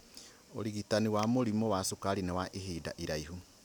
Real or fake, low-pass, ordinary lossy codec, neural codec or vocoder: real; none; none; none